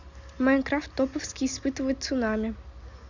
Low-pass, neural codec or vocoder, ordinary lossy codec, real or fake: 7.2 kHz; none; Opus, 64 kbps; real